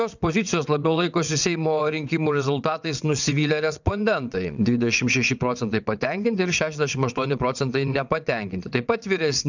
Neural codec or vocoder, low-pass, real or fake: vocoder, 22.05 kHz, 80 mel bands, WaveNeXt; 7.2 kHz; fake